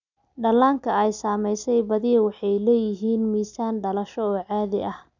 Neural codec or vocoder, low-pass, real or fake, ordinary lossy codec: none; 7.2 kHz; real; none